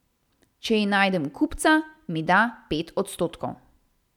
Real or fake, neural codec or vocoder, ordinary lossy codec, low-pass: real; none; none; 19.8 kHz